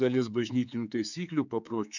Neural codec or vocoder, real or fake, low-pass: codec, 16 kHz, 4 kbps, X-Codec, HuBERT features, trained on general audio; fake; 7.2 kHz